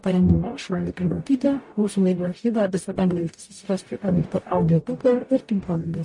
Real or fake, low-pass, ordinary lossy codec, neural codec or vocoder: fake; 10.8 kHz; MP3, 48 kbps; codec, 44.1 kHz, 0.9 kbps, DAC